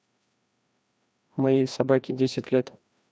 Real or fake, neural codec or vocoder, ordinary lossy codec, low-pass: fake; codec, 16 kHz, 1 kbps, FreqCodec, larger model; none; none